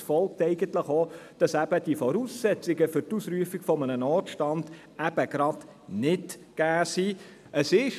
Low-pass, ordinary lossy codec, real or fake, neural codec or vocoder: 14.4 kHz; none; real; none